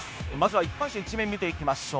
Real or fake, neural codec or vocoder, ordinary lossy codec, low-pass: fake; codec, 16 kHz, 0.9 kbps, LongCat-Audio-Codec; none; none